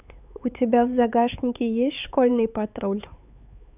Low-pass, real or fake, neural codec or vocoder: 3.6 kHz; fake; codec, 16 kHz, 4 kbps, X-Codec, HuBERT features, trained on LibriSpeech